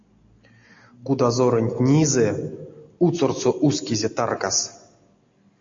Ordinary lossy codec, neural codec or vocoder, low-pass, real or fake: AAC, 48 kbps; none; 7.2 kHz; real